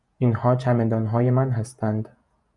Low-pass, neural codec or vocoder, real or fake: 10.8 kHz; vocoder, 48 kHz, 128 mel bands, Vocos; fake